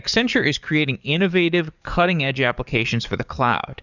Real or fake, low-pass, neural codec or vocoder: fake; 7.2 kHz; codec, 44.1 kHz, 7.8 kbps, DAC